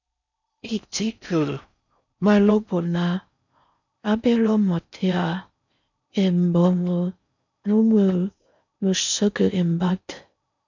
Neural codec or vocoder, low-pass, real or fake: codec, 16 kHz in and 24 kHz out, 0.6 kbps, FocalCodec, streaming, 4096 codes; 7.2 kHz; fake